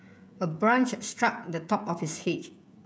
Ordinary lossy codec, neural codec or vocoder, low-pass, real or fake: none; codec, 16 kHz, 16 kbps, FreqCodec, smaller model; none; fake